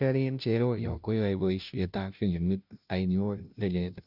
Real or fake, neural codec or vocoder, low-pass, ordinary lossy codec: fake; codec, 16 kHz, 0.5 kbps, FunCodec, trained on Chinese and English, 25 frames a second; 5.4 kHz; none